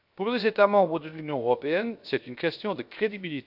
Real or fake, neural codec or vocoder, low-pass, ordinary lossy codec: fake; codec, 16 kHz, 0.7 kbps, FocalCodec; 5.4 kHz; none